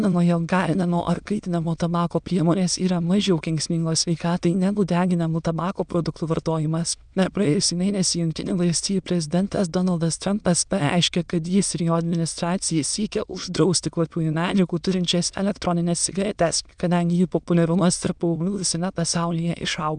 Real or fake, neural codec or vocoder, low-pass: fake; autoencoder, 22.05 kHz, a latent of 192 numbers a frame, VITS, trained on many speakers; 9.9 kHz